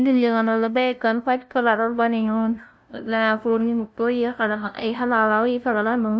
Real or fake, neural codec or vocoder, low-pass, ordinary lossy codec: fake; codec, 16 kHz, 0.5 kbps, FunCodec, trained on LibriTTS, 25 frames a second; none; none